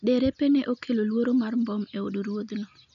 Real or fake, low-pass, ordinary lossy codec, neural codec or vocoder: real; 7.2 kHz; none; none